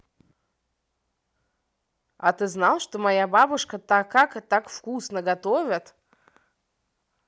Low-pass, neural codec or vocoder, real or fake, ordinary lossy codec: none; none; real; none